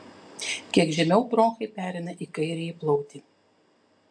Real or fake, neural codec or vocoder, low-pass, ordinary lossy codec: real; none; 9.9 kHz; AAC, 64 kbps